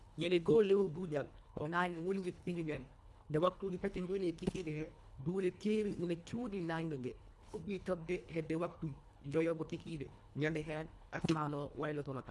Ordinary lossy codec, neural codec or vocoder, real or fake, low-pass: none; codec, 24 kHz, 1.5 kbps, HILCodec; fake; none